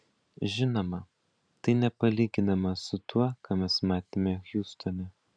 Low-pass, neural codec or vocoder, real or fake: 9.9 kHz; vocoder, 44.1 kHz, 128 mel bands every 512 samples, BigVGAN v2; fake